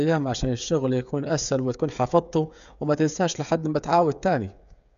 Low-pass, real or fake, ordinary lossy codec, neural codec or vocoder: 7.2 kHz; fake; none; codec, 16 kHz, 8 kbps, FreqCodec, smaller model